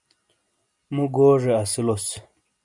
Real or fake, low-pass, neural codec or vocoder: real; 10.8 kHz; none